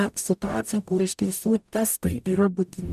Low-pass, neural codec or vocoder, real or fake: 14.4 kHz; codec, 44.1 kHz, 0.9 kbps, DAC; fake